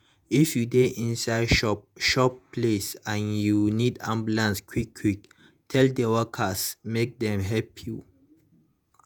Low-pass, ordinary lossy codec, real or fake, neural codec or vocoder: none; none; fake; vocoder, 48 kHz, 128 mel bands, Vocos